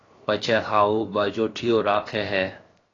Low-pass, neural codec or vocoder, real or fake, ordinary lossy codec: 7.2 kHz; codec, 16 kHz, 0.7 kbps, FocalCodec; fake; AAC, 32 kbps